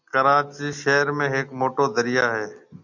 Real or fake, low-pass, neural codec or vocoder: real; 7.2 kHz; none